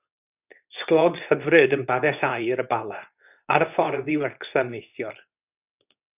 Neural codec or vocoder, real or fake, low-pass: codec, 24 kHz, 0.9 kbps, WavTokenizer, medium speech release version 2; fake; 3.6 kHz